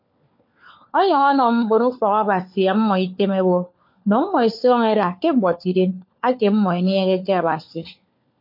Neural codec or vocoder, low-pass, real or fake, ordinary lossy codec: codec, 16 kHz, 4 kbps, FunCodec, trained on LibriTTS, 50 frames a second; 5.4 kHz; fake; MP3, 32 kbps